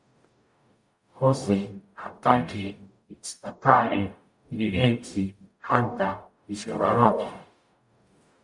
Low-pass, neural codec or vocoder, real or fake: 10.8 kHz; codec, 44.1 kHz, 0.9 kbps, DAC; fake